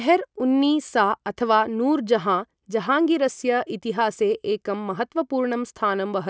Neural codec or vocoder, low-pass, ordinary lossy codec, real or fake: none; none; none; real